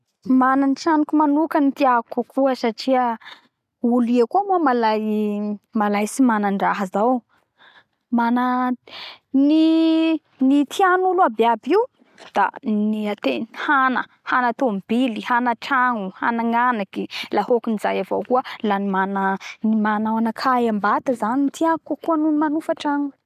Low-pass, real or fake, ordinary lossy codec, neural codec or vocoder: 14.4 kHz; real; none; none